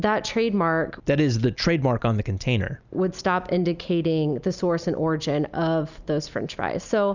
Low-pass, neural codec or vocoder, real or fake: 7.2 kHz; none; real